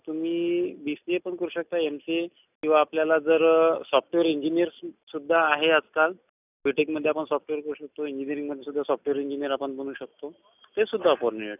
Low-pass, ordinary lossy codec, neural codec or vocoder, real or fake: 3.6 kHz; none; none; real